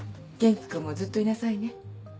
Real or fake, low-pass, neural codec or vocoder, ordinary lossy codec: real; none; none; none